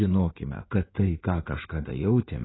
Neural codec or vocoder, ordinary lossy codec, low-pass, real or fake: codec, 16 kHz in and 24 kHz out, 2.2 kbps, FireRedTTS-2 codec; AAC, 16 kbps; 7.2 kHz; fake